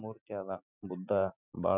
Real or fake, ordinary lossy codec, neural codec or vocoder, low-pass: real; none; none; 3.6 kHz